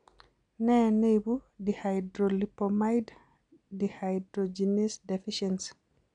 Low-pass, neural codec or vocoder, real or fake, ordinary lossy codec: 9.9 kHz; none; real; none